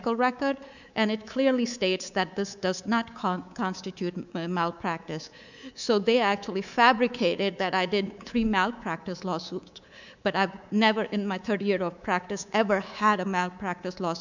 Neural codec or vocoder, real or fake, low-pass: codec, 24 kHz, 3.1 kbps, DualCodec; fake; 7.2 kHz